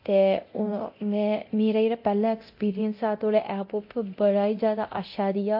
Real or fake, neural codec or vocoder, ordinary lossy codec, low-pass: fake; codec, 24 kHz, 0.9 kbps, DualCodec; MP3, 32 kbps; 5.4 kHz